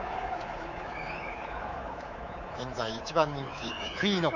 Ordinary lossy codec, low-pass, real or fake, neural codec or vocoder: none; 7.2 kHz; fake; codec, 24 kHz, 3.1 kbps, DualCodec